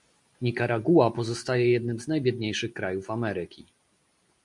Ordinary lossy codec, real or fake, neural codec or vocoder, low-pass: MP3, 64 kbps; real; none; 10.8 kHz